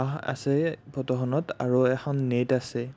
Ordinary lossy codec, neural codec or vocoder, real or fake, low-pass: none; none; real; none